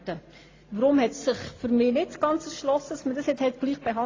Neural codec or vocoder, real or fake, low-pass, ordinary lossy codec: none; real; 7.2 kHz; AAC, 32 kbps